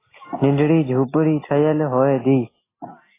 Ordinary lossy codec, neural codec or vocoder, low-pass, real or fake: AAC, 16 kbps; none; 3.6 kHz; real